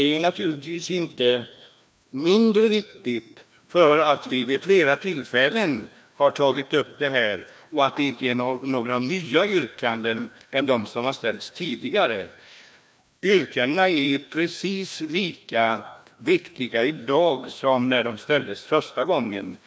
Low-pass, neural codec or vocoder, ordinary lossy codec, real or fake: none; codec, 16 kHz, 1 kbps, FreqCodec, larger model; none; fake